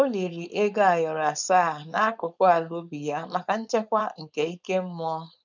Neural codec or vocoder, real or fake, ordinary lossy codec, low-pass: codec, 16 kHz, 4.8 kbps, FACodec; fake; none; 7.2 kHz